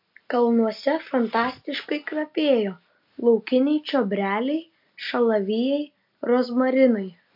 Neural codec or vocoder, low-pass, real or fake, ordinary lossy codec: none; 5.4 kHz; real; MP3, 48 kbps